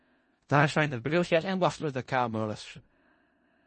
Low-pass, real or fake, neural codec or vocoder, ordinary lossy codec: 10.8 kHz; fake; codec, 16 kHz in and 24 kHz out, 0.4 kbps, LongCat-Audio-Codec, four codebook decoder; MP3, 32 kbps